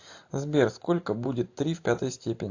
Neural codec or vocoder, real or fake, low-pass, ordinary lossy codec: none; real; 7.2 kHz; AAC, 48 kbps